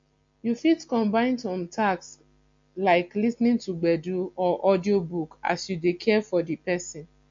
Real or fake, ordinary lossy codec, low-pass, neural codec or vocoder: real; MP3, 48 kbps; 7.2 kHz; none